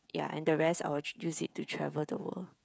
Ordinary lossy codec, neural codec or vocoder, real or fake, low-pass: none; codec, 16 kHz, 16 kbps, FreqCodec, smaller model; fake; none